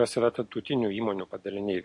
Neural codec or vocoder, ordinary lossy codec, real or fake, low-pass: none; MP3, 48 kbps; real; 10.8 kHz